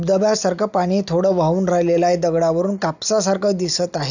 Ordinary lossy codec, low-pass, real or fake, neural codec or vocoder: none; 7.2 kHz; real; none